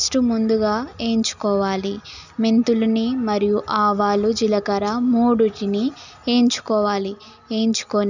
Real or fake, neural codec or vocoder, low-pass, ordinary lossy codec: real; none; 7.2 kHz; none